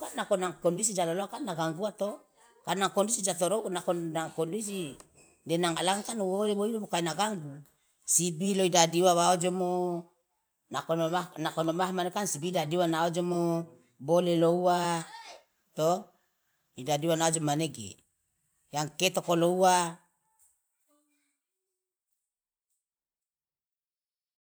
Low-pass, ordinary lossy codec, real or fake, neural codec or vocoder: none; none; fake; vocoder, 44.1 kHz, 128 mel bands every 512 samples, BigVGAN v2